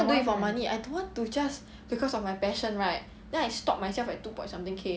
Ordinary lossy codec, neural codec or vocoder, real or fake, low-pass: none; none; real; none